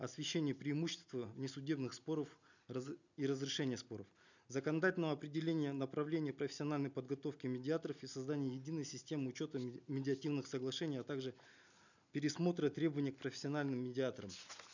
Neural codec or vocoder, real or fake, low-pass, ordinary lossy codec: none; real; 7.2 kHz; none